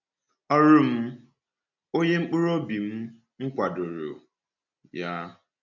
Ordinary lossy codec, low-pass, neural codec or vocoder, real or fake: none; 7.2 kHz; none; real